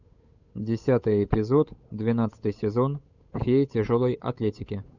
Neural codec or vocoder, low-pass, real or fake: codec, 16 kHz, 8 kbps, FunCodec, trained on Chinese and English, 25 frames a second; 7.2 kHz; fake